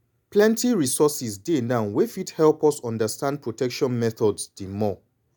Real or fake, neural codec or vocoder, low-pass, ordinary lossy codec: real; none; none; none